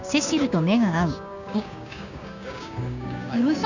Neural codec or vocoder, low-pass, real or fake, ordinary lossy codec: codec, 16 kHz, 6 kbps, DAC; 7.2 kHz; fake; none